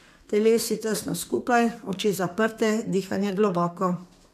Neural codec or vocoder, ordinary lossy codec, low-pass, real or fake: codec, 32 kHz, 1.9 kbps, SNAC; none; 14.4 kHz; fake